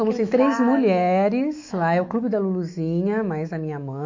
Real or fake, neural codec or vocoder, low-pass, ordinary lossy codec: fake; autoencoder, 48 kHz, 128 numbers a frame, DAC-VAE, trained on Japanese speech; 7.2 kHz; MP3, 48 kbps